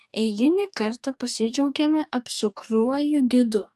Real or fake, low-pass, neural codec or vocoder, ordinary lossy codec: fake; 14.4 kHz; codec, 44.1 kHz, 2.6 kbps, DAC; AAC, 96 kbps